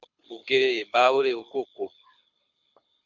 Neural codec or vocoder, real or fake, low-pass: codec, 24 kHz, 6 kbps, HILCodec; fake; 7.2 kHz